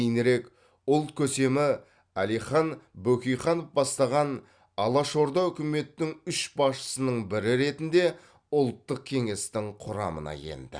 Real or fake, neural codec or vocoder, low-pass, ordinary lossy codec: real; none; 9.9 kHz; Opus, 64 kbps